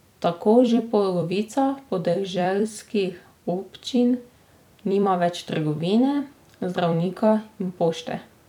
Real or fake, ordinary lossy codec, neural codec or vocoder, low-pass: fake; none; vocoder, 44.1 kHz, 128 mel bands every 256 samples, BigVGAN v2; 19.8 kHz